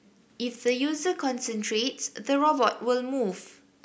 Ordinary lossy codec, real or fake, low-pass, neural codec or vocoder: none; real; none; none